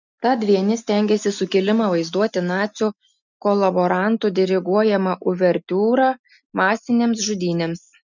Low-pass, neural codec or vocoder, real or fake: 7.2 kHz; none; real